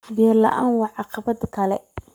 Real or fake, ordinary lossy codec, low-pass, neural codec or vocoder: fake; none; none; codec, 44.1 kHz, 7.8 kbps, Pupu-Codec